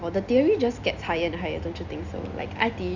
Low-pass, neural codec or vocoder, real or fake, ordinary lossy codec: 7.2 kHz; none; real; Opus, 64 kbps